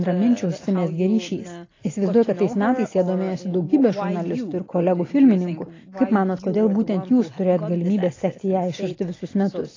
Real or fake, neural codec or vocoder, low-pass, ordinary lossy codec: real; none; 7.2 kHz; AAC, 32 kbps